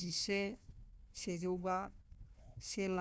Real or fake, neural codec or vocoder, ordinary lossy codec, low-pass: fake; codec, 16 kHz, 1 kbps, FunCodec, trained on Chinese and English, 50 frames a second; none; none